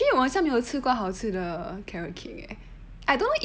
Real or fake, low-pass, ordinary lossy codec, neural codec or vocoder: real; none; none; none